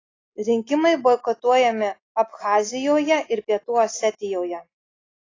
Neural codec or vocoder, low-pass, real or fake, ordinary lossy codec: none; 7.2 kHz; real; AAC, 32 kbps